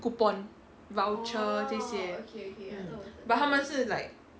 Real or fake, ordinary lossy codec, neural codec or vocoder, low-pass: real; none; none; none